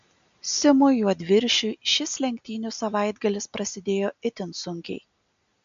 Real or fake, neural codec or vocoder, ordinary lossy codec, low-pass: real; none; MP3, 64 kbps; 7.2 kHz